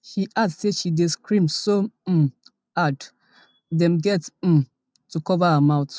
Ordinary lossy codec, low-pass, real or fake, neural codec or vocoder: none; none; real; none